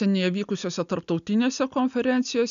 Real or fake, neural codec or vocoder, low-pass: real; none; 7.2 kHz